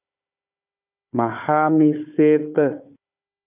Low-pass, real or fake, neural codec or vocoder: 3.6 kHz; fake; codec, 16 kHz, 4 kbps, FunCodec, trained on Chinese and English, 50 frames a second